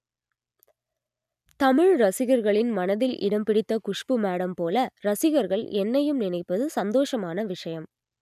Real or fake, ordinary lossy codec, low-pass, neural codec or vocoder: real; none; 14.4 kHz; none